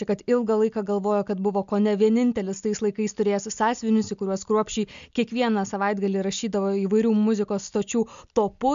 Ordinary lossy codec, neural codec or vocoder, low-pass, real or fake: MP3, 64 kbps; none; 7.2 kHz; real